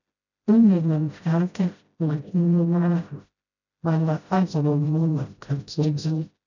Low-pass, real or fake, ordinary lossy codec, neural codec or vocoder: 7.2 kHz; fake; none; codec, 16 kHz, 0.5 kbps, FreqCodec, smaller model